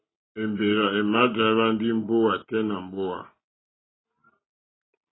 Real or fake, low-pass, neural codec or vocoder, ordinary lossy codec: real; 7.2 kHz; none; AAC, 16 kbps